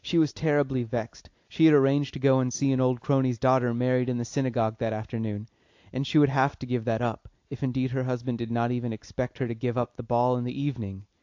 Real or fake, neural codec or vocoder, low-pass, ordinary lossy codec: real; none; 7.2 kHz; AAC, 48 kbps